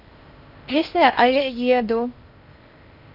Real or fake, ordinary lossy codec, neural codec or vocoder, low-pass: fake; none; codec, 16 kHz in and 24 kHz out, 0.6 kbps, FocalCodec, streaming, 4096 codes; 5.4 kHz